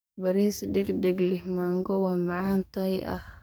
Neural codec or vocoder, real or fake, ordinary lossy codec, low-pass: codec, 44.1 kHz, 2.6 kbps, SNAC; fake; none; none